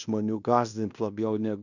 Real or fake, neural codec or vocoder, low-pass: fake; codec, 16 kHz in and 24 kHz out, 0.9 kbps, LongCat-Audio-Codec, fine tuned four codebook decoder; 7.2 kHz